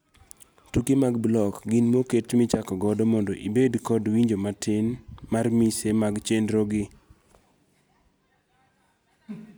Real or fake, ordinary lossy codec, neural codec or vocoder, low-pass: real; none; none; none